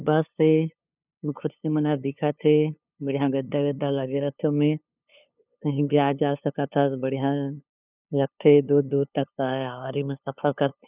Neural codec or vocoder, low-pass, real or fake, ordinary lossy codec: codec, 16 kHz, 2 kbps, FunCodec, trained on LibriTTS, 25 frames a second; 3.6 kHz; fake; none